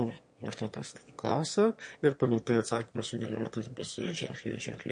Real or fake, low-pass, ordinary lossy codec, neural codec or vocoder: fake; 9.9 kHz; MP3, 48 kbps; autoencoder, 22.05 kHz, a latent of 192 numbers a frame, VITS, trained on one speaker